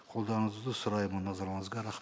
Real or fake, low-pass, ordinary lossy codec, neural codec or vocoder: real; none; none; none